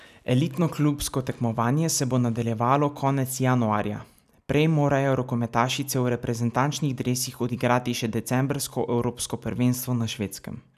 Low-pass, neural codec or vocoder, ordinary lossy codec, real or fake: 14.4 kHz; none; none; real